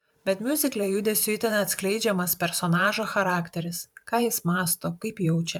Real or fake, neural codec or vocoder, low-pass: fake; vocoder, 44.1 kHz, 128 mel bands every 512 samples, BigVGAN v2; 19.8 kHz